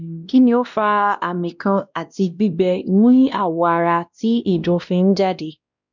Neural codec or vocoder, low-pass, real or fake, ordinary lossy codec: codec, 16 kHz, 1 kbps, X-Codec, WavLM features, trained on Multilingual LibriSpeech; 7.2 kHz; fake; none